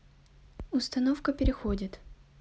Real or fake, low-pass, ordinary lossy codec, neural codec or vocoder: real; none; none; none